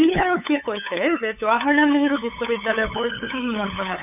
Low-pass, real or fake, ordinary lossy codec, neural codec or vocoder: 3.6 kHz; fake; none; codec, 16 kHz, 8 kbps, FunCodec, trained on LibriTTS, 25 frames a second